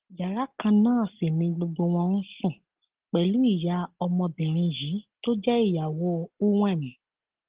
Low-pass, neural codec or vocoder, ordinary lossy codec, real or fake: 3.6 kHz; none; Opus, 16 kbps; real